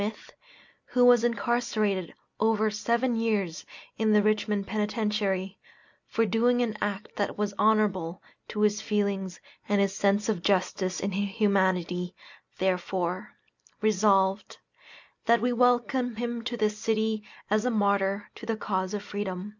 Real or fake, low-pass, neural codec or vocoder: real; 7.2 kHz; none